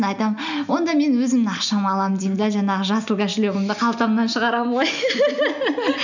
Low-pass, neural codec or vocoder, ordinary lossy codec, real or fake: 7.2 kHz; none; none; real